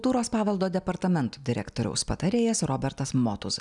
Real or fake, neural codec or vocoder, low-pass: real; none; 10.8 kHz